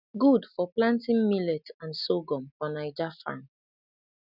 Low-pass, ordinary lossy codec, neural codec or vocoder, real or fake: 5.4 kHz; none; none; real